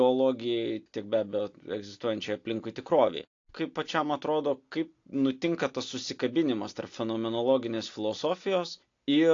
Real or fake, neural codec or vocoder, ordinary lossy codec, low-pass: real; none; AAC, 48 kbps; 7.2 kHz